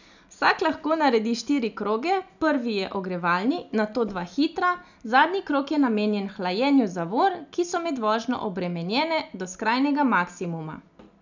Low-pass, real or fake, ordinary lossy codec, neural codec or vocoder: 7.2 kHz; real; none; none